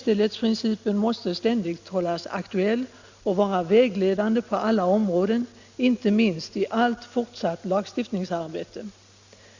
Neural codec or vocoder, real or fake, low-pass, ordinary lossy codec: none; real; 7.2 kHz; Opus, 64 kbps